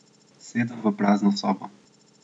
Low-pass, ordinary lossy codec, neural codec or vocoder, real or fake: 9.9 kHz; MP3, 96 kbps; none; real